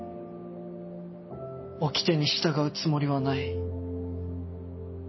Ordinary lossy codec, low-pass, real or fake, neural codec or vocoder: MP3, 24 kbps; 7.2 kHz; real; none